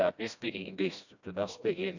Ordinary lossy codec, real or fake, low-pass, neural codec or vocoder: none; fake; 7.2 kHz; codec, 16 kHz, 1 kbps, FreqCodec, smaller model